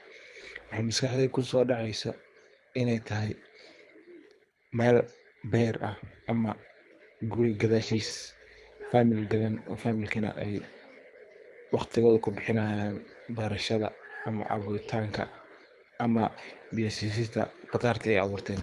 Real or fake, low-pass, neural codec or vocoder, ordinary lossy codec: fake; none; codec, 24 kHz, 3 kbps, HILCodec; none